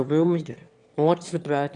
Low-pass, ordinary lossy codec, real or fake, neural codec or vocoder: 9.9 kHz; none; fake; autoencoder, 22.05 kHz, a latent of 192 numbers a frame, VITS, trained on one speaker